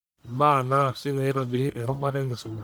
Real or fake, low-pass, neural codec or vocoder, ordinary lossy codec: fake; none; codec, 44.1 kHz, 1.7 kbps, Pupu-Codec; none